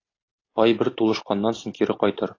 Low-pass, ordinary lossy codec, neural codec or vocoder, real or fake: 7.2 kHz; AAC, 32 kbps; none; real